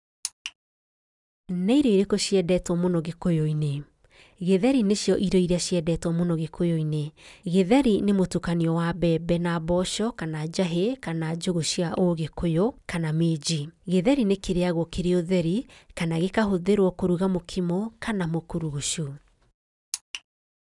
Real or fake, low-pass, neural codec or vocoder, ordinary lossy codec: real; 10.8 kHz; none; none